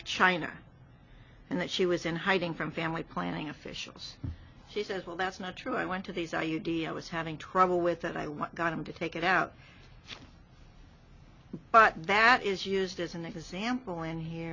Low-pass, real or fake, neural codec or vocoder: 7.2 kHz; real; none